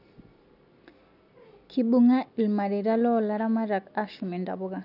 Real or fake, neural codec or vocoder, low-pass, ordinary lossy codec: real; none; 5.4 kHz; none